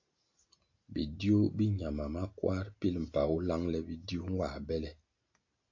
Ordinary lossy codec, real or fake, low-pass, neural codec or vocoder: AAC, 48 kbps; real; 7.2 kHz; none